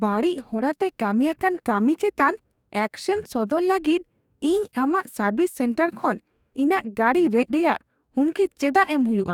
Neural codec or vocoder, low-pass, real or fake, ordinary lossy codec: codec, 44.1 kHz, 2.6 kbps, DAC; 19.8 kHz; fake; none